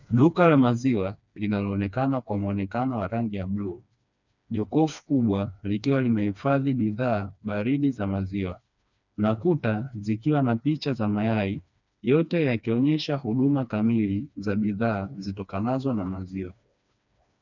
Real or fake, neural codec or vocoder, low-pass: fake; codec, 16 kHz, 2 kbps, FreqCodec, smaller model; 7.2 kHz